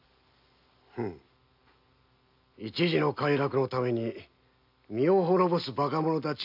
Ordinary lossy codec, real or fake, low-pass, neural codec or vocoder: AAC, 48 kbps; real; 5.4 kHz; none